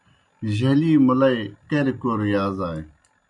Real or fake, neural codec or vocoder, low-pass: real; none; 10.8 kHz